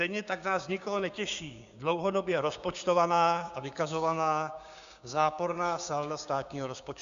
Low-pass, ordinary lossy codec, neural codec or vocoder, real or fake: 7.2 kHz; Opus, 64 kbps; codec, 16 kHz, 6 kbps, DAC; fake